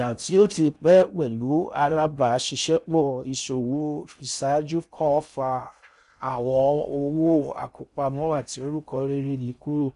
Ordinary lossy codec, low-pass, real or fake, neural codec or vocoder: none; 10.8 kHz; fake; codec, 16 kHz in and 24 kHz out, 0.6 kbps, FocalCodec, streaming, 4096 codes